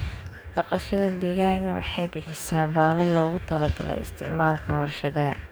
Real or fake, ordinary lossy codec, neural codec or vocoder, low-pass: fake; none; codec, 44.1 kHz, 2.6 kbps, DAC; none